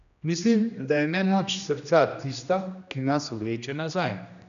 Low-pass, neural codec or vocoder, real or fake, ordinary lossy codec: 7.2 kHz; codec, 16 kHz, 1 kbps, X-Codec, HuBERT features, trained on general audio; fake; none